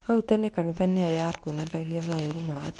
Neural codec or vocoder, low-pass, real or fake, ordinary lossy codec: codec, 24 kHz, 0.9 kbps, WavTokenizer, medium speech release version 2; 10.8 kHz; fake; none